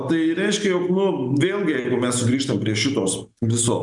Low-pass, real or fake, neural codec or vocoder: 10.8 kHz; real; none